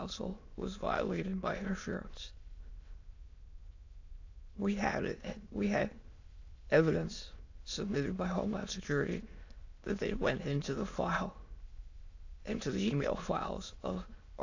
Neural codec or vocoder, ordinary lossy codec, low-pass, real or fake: autoencoder, 22.05 kHz, a latent of 192 numbers a frame, VITS, trained on many speakers; AAC, 48 kbps; 7.2 kHz; fake